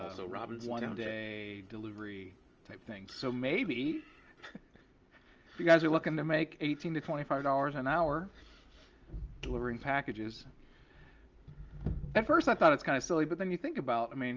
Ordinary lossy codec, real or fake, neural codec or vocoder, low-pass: Opus, 24 kbps; real; none; 7.2 kHz